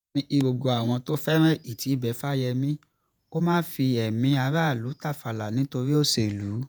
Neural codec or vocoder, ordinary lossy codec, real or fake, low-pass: vocoder, 48 kHz, 128 mel bands, Vocos; none; fake; none